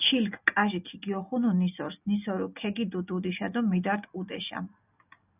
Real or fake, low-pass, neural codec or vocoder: real; 3.6 kHz; none